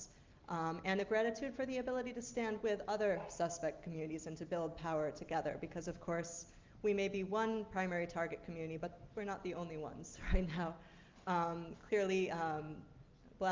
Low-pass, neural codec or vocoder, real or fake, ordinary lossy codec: 7.2 kHz; none; real; Opus, 32 kbps